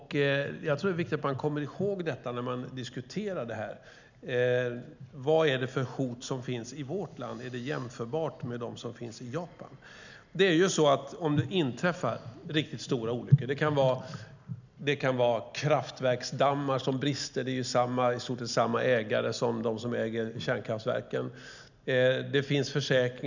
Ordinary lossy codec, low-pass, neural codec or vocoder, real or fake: none; 7.2 kHz; none; real